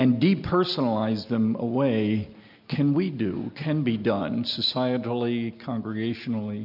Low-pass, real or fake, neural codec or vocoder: 5.4 kHz; real; none